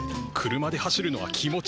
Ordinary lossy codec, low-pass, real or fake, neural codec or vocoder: none; none; real; none